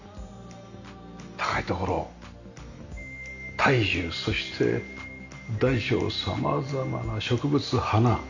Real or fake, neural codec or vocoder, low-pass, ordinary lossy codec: real; none; 7.2 kHz; none